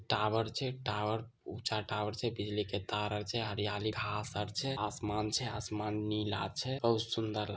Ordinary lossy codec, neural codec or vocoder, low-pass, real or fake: none; none; none; real